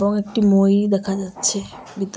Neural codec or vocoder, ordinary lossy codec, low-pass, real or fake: none; none; none; real